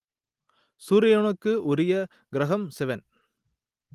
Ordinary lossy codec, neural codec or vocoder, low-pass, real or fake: Opus, 24 kbps; none; 14.4 kHz; real